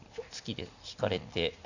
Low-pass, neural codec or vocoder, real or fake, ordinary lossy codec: 7.2 kHz; none; real; none